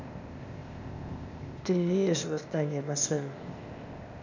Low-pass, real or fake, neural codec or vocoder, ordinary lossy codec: 7.2 kHz; fake; codec, 16 kHz, 0.8 kbps, ZipCodec; none